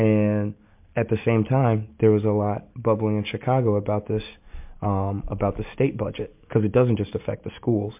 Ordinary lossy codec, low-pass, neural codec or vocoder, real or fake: MP3, 32 kbps; 3.6 kHz; none; real